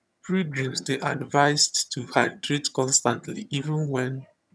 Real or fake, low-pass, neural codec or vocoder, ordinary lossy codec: fake; none; vocoder, 22.05 kHz, 80 mel bands, HiFi-GAN; none